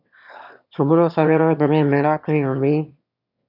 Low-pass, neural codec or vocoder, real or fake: 5.4 kHz; autoencoder, 22.05 kHz, a latent of 192 numbers a frame, VITS, trained on one speaker; fake